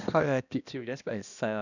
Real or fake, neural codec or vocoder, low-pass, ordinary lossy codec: fake; codec, 16 kHz, 1 kbps, X-Codec, HuBERT features, trained on balanced general audio; 7.2 kHz; none